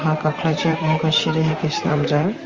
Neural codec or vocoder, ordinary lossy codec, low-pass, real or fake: vocoder, 44.1 kHz, 128 mel bands, Pupu-Vocoder; Opus, 32 kbps; 7.2 kHz; fake